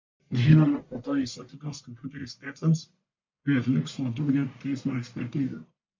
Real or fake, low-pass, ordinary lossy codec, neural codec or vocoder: fake; 7.2 kHz; MP3, 64 kbps; codec, 44.1 kHz, 1.7 kbps, Pupu-Codec